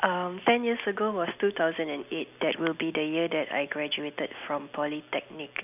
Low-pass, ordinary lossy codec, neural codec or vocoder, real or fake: 3.6 kHz; none; none; real